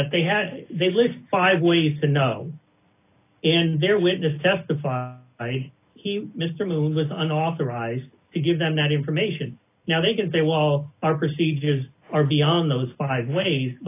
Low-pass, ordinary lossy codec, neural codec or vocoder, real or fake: 3.6 kHz; AAC, 24 kbps; none; real